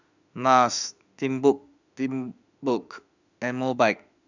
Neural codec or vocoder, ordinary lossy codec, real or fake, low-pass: autoencoder, 48 kHz, 32 numbers a frame, DAC-VAE, trained on Japanese speech; none; fake; 7.2 kHz